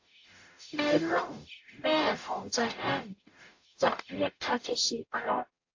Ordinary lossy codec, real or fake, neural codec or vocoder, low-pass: AAC, 48 kbps; fake; codec, 44.1 kHz, 0.9 kbps, DAC; 7.2 kHz